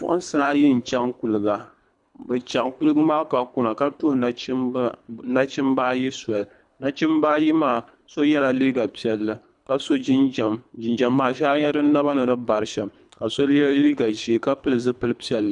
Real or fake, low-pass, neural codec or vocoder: fake; 10.8 kHz; codec, 24 kHz, 3 kbps, HILCodec